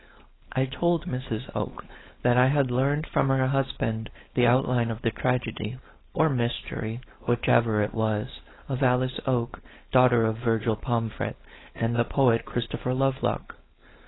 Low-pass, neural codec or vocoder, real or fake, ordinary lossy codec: 7.2 kHz; codec, 16 kHz, 4.8 kbps, FACodec; fake; AAC, 16 kbps